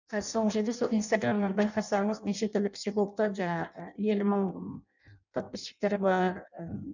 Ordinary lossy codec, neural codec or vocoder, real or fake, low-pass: none; codec, 16 kHz in and 24 kHz out, 0.6 kbps, FireRedTTS-2 codec; fake; 7.2 kHz